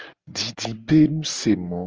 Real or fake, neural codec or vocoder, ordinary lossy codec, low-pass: real; none; Opus, 24 kbps; 7.2 kHz